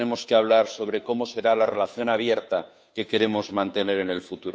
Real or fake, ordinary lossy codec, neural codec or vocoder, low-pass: fake; none; codec, 16 kHz, 2 kbps, FunCodec, trained on Chinese and English, 25 frames a second; none